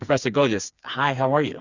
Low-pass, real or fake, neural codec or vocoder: 7.2 kHz; fake; codec, 16 kHz, 4 kbps, FreqCodec, smaller model